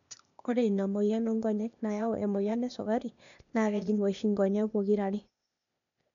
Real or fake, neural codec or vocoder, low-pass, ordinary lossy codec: fake; codec, 16 kHz, 0.8 kbps, ZipCodec; 7.2 kHz; none